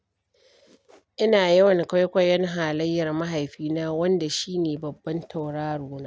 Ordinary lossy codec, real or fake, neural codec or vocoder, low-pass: none; real; none; none